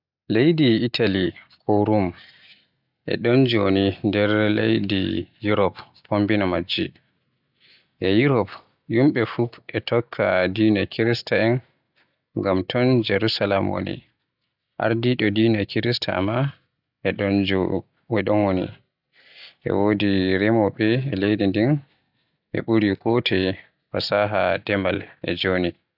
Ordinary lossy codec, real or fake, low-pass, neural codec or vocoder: none; real; 5.4 kHz; none